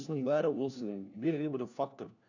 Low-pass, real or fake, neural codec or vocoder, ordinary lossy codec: 7.2 kHz; fake; codec, 16 kHz, 1 kbps, FunCodec, trained on LibriTTS, 50 frames a second; none